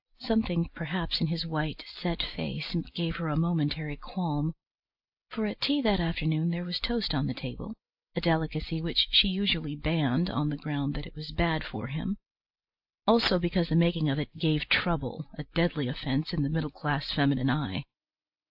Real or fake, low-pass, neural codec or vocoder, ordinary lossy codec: real; 5.4 kHz; none; AAC, 48 kbps